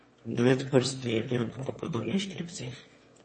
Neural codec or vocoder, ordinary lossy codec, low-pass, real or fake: autoencoder, 22.05 kHz, a latent of 192 numbers a frame, VITS, trained on one speaker; MP3, 32 kbps; 9.9 kHz; fake